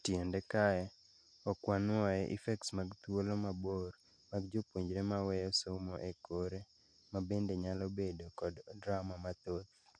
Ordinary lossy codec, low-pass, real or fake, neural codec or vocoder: MP3, 64 kbps; 9.9 kHz; real; none